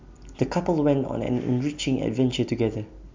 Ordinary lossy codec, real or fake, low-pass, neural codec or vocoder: MP3, 64 kbps; real; 7.2 kHz; none